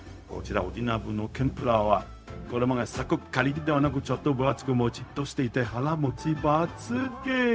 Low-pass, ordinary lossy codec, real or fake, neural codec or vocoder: none; none; fake; codec, 16 kHz, 0.4 kbps, LongCat-Audio-Codec